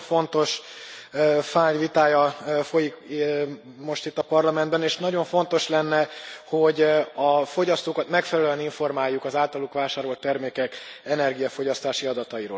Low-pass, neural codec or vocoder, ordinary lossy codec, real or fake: none; none; none; real